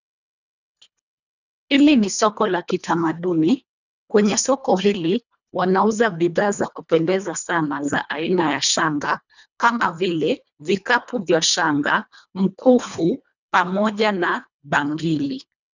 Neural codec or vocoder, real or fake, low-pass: codec, 24 kHz, 1.5 kbps, HILCodec; fake; 7.2 kHz